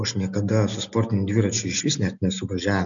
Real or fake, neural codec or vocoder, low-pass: real; none; 7.2 kHz